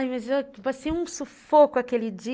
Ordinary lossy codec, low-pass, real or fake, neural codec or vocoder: none; none; real; none